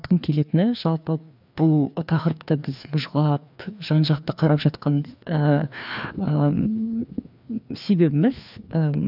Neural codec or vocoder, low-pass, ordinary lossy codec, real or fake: codec, 16 kHz, 2 kbps, FreqCodec, larger model; 5.4 kHz; none; fake